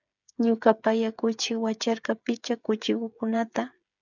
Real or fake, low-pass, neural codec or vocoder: fake; 7.2 kHz; codec, 16 kHz, 8 kbps, FreqCodec, smaller model